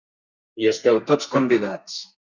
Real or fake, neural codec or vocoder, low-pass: fake; codec, 32 kHz, 1.9 kbps, SNAC; 7.2 kHz